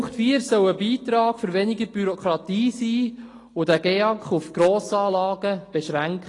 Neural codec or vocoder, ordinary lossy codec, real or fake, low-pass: none; AAC, 32 kbps; real; 10.8 kHz